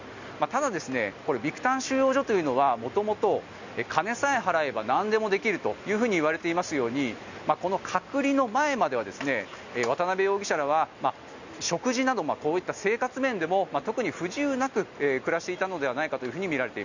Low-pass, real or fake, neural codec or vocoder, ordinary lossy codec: 7.2 kHz; real; none; none